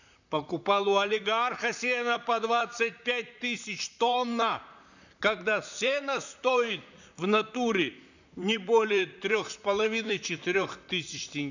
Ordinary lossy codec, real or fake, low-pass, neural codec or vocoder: none; fake; 7.2 kHz; vocoder, 44.1 kHz, 128 mel bands, Pupu-Vocoder